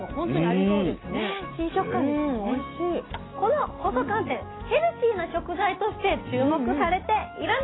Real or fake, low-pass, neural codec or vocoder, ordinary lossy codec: real; 7.2 kHz; none; AAC, 16 kbps